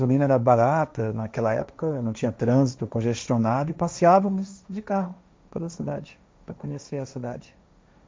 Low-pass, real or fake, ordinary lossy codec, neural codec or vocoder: none; fake; none; codec, 16 kHz, 1.1 kbps, Voila-Tokenizer